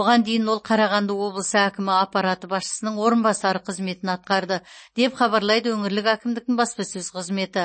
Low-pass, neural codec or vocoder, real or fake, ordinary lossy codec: 9.9 kHz; none; real; MP3, 32 kbps